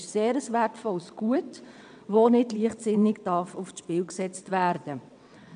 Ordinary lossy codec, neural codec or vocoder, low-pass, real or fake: none; vocoder, 22.05 kHz, 80 mel bands, WaveNeXt; 9.9 kHz; fake